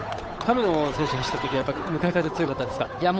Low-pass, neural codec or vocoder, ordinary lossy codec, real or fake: none; codec, 16 kHz, 8 kbps, FunCodec, trained on Chinese and English, 25 frames a second; none; fake